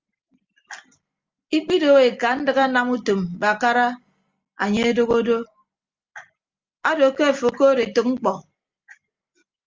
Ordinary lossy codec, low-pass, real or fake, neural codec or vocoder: Opus, 32 kbps; 7.2 kHz; real; none